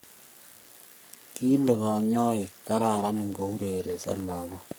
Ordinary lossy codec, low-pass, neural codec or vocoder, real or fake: none; none; codec, 44.1 kHz, 3.4 kbps, Pupu-Codec; fake